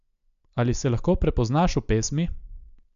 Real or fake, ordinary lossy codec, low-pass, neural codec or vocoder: real; MP3, 64 kbps; 7.2 kHz; none